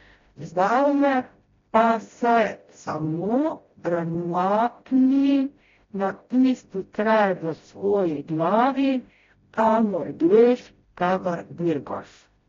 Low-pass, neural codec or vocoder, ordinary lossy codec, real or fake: 7.2 kHz; codec, 16 kHz, 0.5 kbps, FreqCodec, smaller model; AAC, 32 kbps; fake